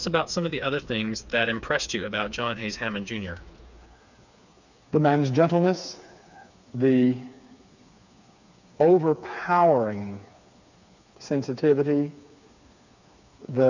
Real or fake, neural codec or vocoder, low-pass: fake; codec, 16 kHz, 4 kbps, FreqCodec, smaller model; 7.2 kHz